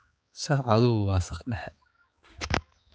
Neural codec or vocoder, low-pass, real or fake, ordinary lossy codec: codec, 16 kHz, 4 kbps, X-Codec, HuBERT features, trained on balanced general audio; none; fake; none